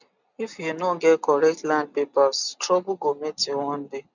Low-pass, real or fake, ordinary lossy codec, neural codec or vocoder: 7.2 kHz; real; none; none